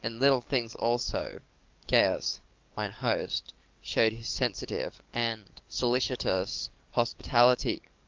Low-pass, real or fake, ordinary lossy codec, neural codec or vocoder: 7.2 kHz; fake; Opus, 32 kbps; codec, 44.1 kHz, 7.8 kbps, DAC